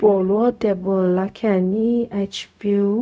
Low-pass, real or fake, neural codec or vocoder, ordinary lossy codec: none; fake; codec, 16 kHz, 0.4 kbps, LongCat-Audio-Codec; none